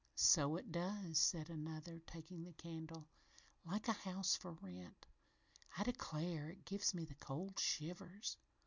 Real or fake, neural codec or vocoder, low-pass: real; none; 7.2 kHz